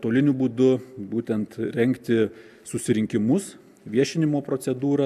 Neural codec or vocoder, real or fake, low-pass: none; real; 14.4 kHz